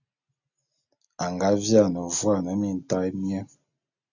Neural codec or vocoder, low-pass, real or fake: none; 7.2 kHz; real